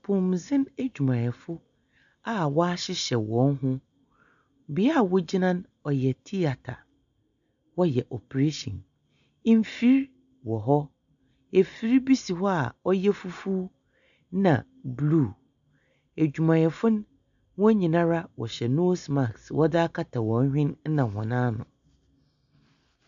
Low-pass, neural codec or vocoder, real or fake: 7.2 kHz; none; real